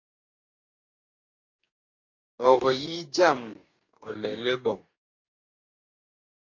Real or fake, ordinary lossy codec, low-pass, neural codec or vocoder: fake; AAC, 48 kbps; 7.2 kHz; codec, 44.1 kHz, 2.6 kbps, DAC